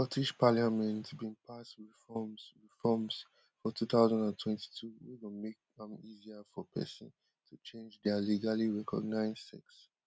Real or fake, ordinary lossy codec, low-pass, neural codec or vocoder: real; none; none; none